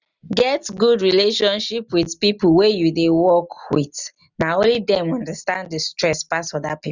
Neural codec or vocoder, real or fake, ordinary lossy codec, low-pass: none; real; none; 7.2 kHz